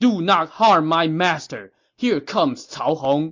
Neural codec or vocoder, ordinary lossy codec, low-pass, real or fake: none; MP3, 48 kbps; 7.2 kHz; real